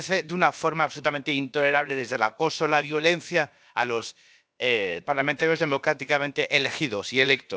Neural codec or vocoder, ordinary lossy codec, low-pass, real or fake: codec, 16 kHz, about 1 kbps, DyCAST, with the encoder's durations; none; none; fake